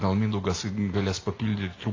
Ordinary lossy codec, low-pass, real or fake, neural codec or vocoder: AAC, 32 kbps; 7.2 kHz; real; none